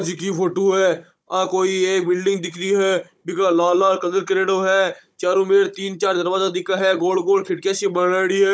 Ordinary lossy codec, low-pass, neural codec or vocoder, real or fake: none; none; codec, 16 kHz, 16 kbps, FunCodec, trained on Chinese and English, 50 frames a second; fake